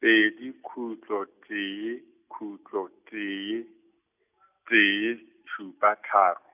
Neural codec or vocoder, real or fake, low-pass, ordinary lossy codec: none; real; 3.6 kHz; none